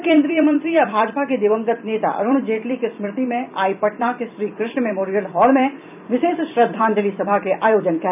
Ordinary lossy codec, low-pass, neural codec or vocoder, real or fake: none; 3.6 kHz; vocoder, 44.1 kHz, 128 mel bands every 256 samples, BigVGAN v2; fake